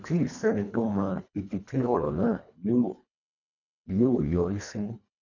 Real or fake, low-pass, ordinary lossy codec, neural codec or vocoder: fake; 7.2 kHz; none; codec, 24 kHz, 1.5 kbps, HILCodec